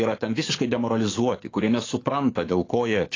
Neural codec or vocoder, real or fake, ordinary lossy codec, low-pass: codec, 44.1 kHz, 7.8 kbps, DAC; fake; AAC, 32 kbps; 7.2 kHz